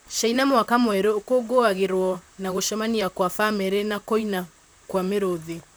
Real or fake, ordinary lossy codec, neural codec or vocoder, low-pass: fake; none; vocoder, 44.1 kHz, 128 mel bands, Pupu-Vocoder; none